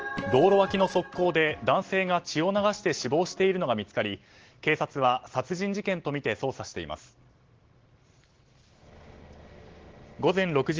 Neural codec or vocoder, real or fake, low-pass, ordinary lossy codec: none; real; 7.2 kHz; Opus, 16 kbps